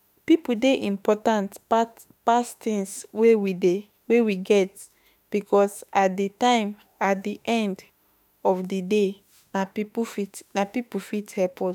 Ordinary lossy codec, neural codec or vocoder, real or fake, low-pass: none; autoencoder, 48 kHz, 32 numbers a frame, DAC-VAE, trained on Japanese speech; fake; none